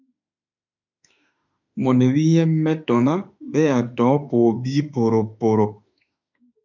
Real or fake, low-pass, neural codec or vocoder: fake; 7.2 kHz; autoencoder, 48 kHz, 32 numbers a frame, DAC-VAE, trained on Japanese speech